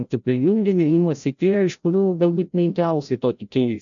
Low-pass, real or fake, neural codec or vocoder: 7.2 kHz; fake; codec, 16 kHz, 0.5 kbps, FreqCodec, larger model